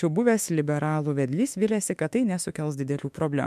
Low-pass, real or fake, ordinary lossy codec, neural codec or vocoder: 14.4 kHz; fake; MP3, 96 kbps; autoencoder, 48 kHz, 32 numbers a frame, DAC-VAE, trained on Japanese speech